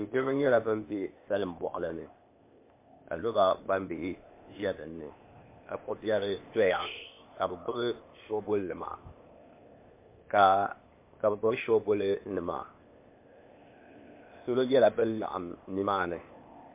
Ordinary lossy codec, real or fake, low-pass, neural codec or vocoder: MP3, 24 kbps; fake; 3.6 kHz; codec, 16 kHz, 0.8 kbps, ZipCodec